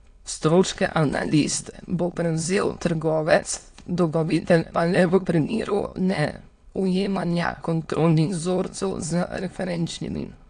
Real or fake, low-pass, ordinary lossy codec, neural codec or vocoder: fake; 9.9 kHz; AAC, 48 kbps; autoencoder, 22.05 kHz, a latent of 192 numbers a frame, VITS, trained on many speakers